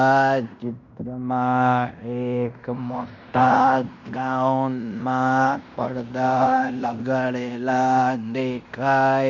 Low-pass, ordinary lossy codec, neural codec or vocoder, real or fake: 7.2 kHz; none; codec, 16 kHz in and 24 kHz out, 0.9 kbps, LongCat-Audio-Codec, fine tuned four codebook decoder; fake